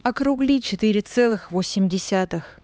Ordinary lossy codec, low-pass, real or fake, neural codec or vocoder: none; none; fake; codec, 16 kHz, 2 kbps, X-Codec, HuBERT features, trained on LibriSpeech